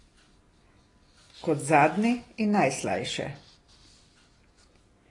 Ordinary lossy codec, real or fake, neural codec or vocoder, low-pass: AAC, 32 kbps; real; none; 10.8 kHz